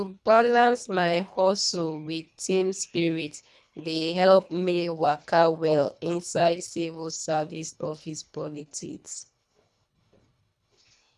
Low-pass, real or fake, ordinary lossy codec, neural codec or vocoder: none; fake; none; codec, 24 kHz, 1.5 kbps, HILCodec